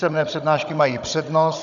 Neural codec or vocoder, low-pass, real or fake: codec, 16 kHz, 16 kbps, FunCodec, trained on Chinese and English, 50 frames a second; 7.2 kHz; fake